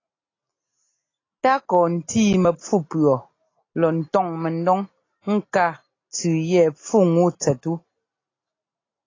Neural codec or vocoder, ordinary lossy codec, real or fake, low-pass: none; AAC, 32 kbps; real; 7.2 kHz